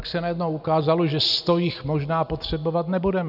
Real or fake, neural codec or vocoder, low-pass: real; none; 5.4 kHz